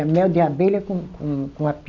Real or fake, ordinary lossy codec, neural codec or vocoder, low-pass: real; none; none; 7.2 kHz